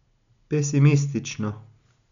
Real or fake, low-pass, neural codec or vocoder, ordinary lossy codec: real; 7.2 kHz; none; none